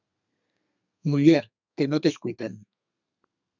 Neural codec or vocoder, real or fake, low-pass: codec, 32 kHz, 1.9 kbps, SNAC; fake; 7.2 kHz